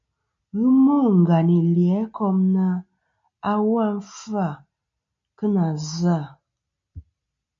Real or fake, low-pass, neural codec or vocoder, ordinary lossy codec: real; 7.2 kHz; none; MP3, 48 kbps